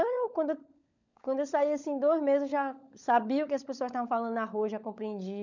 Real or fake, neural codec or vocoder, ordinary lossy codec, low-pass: fake; codec, 16 kHz, 16 kbps, FunCodec, trained on LibriTTS, 50 frames a second; none; 7.2 kHz